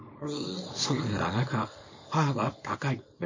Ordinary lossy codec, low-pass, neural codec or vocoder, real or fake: MP3, 32 kbps; 7.2 kHz; codec, 24 kHz, 0.9 kbps, WavTokenizer, small release; fake